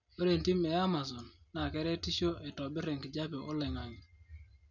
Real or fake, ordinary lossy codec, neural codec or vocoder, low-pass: real; none; none; 7.2 kHz